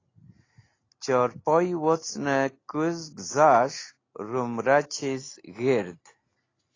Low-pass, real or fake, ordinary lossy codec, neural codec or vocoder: 7.2 kHz; real; AAC, 32 kbps; none